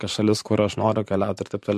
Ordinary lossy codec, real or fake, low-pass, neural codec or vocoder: MP3, 64 kbps; fake; 14.4 kHz; vocoder, 44.1 kHz, 128 mel bands every 512 samples, BigVGAN v2